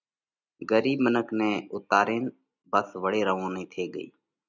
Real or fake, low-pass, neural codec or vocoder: real; 7.2 kHz; none